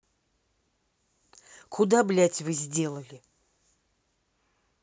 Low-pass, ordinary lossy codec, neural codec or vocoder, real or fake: none; none; none; real